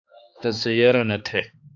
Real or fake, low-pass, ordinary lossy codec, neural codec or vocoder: fake; 7.2 kHz; AAC, 48 kbps; codec, 16 kHz, 2 kbps, X-Codec, HuBERT features, trained on balanced general audio